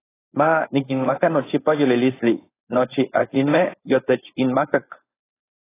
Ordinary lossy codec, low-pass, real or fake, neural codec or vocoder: AAC, 16 kbps; 3.6 kHz; fake; codec, 16 kHz, 4.8 kbps, FACodec